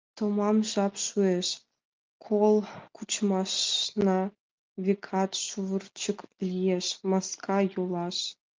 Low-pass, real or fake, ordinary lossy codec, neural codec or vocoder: 7.2 kHz; real; Opus, 32 kbps; none